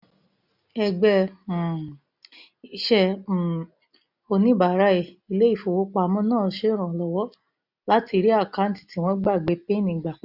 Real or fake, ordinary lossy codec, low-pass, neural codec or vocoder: real; none; 5.4 kHz; none